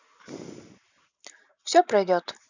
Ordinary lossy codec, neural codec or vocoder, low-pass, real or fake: none; none; 7.2 kHz; real